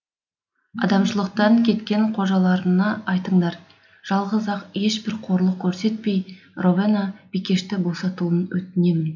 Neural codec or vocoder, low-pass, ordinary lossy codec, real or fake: none; 7.2 kHz; none; real